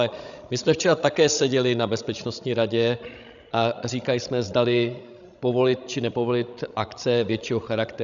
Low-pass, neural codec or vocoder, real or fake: 7.2 kHz; codec, 16 kHz, 16 kbps, FreqCodec, larger model; fake